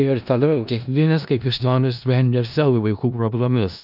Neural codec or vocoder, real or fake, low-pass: codec, 16 kHz in and 24 kHz out, 0.4 kbps, LongCat-Audio-Codec, four codebook decoder; fake; 5.4 kHz